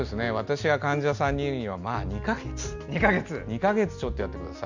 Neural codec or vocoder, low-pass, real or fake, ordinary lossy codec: none; 7.2 kHz; real; Opus, 64 kbps